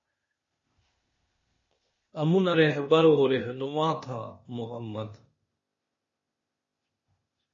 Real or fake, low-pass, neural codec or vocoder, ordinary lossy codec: fake; 7.2 kHz; codec, 16 kHz, 0.8 kbps, ZipCodec; MP3, 32 kbps